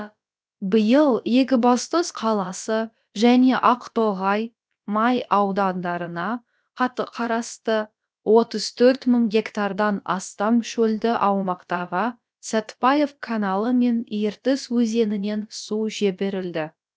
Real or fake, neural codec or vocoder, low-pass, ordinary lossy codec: fake; codec, 16 kHz, about 1 kbps, DyCAST, with the encoder's durations; none; none